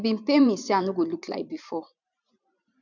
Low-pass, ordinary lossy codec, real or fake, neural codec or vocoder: 7.2 kHz; none; fake; vocoder, 44.1 kHz, 128 mel bands every 512 samples, BigVGAN v2